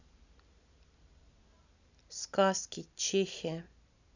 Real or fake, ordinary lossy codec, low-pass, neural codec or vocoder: real; none; 7.2 kHz; none